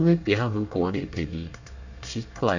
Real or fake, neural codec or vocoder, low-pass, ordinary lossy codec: fake; codec, 24 kHz, 1 kbps, SNAC; 7.2 kHz; none